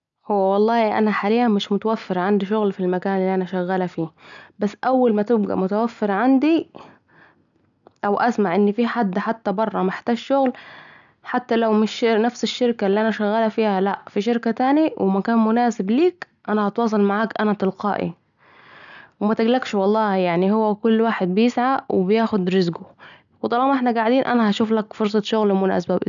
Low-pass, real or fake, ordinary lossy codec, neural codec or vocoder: 7.2 kHz; real; none; none